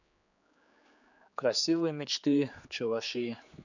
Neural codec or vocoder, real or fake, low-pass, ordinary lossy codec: codec, 16 kHz, 4 kbps, X-Codec, HuBERT features, trained on general audio; fake; 7.2 kHz; none